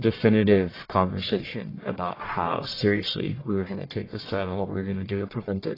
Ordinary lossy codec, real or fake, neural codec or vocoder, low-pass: AAC, 24 kbps; fake; codec, 44.1 kHz, 1.7 kbps, Pupu-Codec; 5.4 kHz